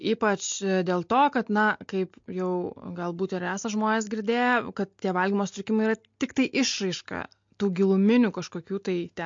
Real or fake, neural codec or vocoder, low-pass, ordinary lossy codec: real; none; 7.2 kHz; MP3, 48 kbps